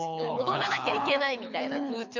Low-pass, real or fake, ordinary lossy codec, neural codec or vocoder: 7.2 kHz; fake; none; codec, 24 kHz, 6 kbps, HILCodec